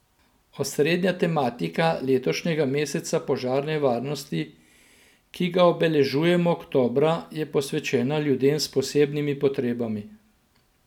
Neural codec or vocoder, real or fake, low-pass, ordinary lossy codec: none; real; 19.8 kHz; none